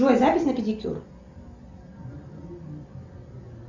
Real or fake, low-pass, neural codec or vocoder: real; 7.2 kHz; none